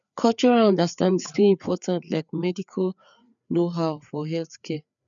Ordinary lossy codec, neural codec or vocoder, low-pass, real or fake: none; codec, 16 kHz, 4 kbps, FreqCodec, larger model; 7.2 kHz; fake